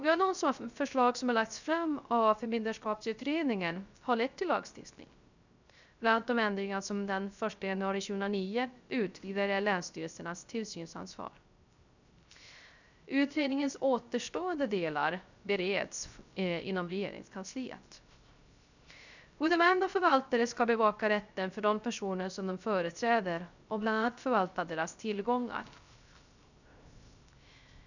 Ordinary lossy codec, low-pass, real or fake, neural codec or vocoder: none; 7.2 kHz; fake; codec, 16 kHz, 0.3 kbps, FocalCodec